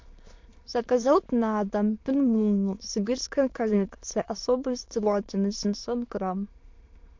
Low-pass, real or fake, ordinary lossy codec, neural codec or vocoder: 7.2 kHz; fake; MP3, 48 kbps; autoencoder, 22.05 kHz, a latent of 192 numbers a frame, VITS, trained on many speakers